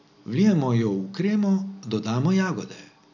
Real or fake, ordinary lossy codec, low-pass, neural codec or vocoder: real; none; 7.2 kHz; none